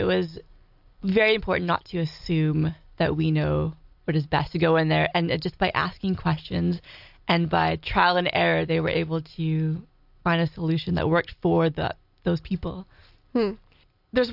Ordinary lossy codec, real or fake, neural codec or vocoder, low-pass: AAC, 48 kbps; real; none; 5.4 kHz